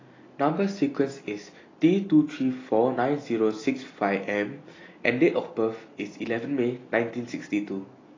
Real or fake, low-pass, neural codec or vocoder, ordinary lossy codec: real; 7.2 kHz; none; AAC, 32 kbps